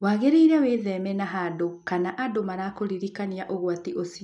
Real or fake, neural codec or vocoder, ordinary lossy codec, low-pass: real; none; none; 10.8 kHz